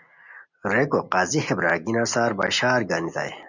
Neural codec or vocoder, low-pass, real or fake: none; 7.2 kHz; real